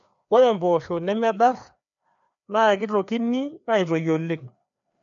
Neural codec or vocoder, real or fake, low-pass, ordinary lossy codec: codec, 16 kHz, 2 kbps, FreqCodec, larger model; fake; 7.2 kHz; none